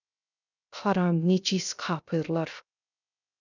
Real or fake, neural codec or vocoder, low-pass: fake; codec, 16 kHz, 0.3 kbps, FocalCodec; 7.2 kHz